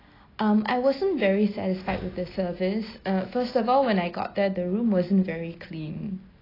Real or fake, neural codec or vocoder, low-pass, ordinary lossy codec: real; none; 5.4 kHz; AAC, 24 kbps